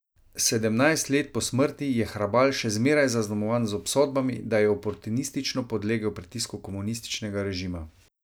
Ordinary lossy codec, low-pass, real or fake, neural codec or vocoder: none; none; real; none